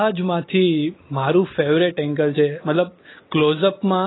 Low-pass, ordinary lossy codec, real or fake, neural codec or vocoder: 7.2 kHz; AAC, 16 kbps; real; none